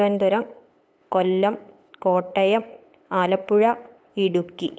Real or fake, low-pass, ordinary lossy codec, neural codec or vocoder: fake; none; none; codec, 16 kHz, 8 kbps, FunCodec, trained on LibriTTS, 25 frames a second